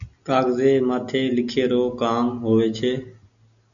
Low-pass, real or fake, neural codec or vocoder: 7.2 kHz; real; none